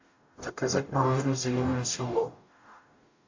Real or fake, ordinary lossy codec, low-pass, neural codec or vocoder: fake; AAC, 48 kbps; 7.2 kHz; codec, 44.1 kHz, 0.9 kbps, DAC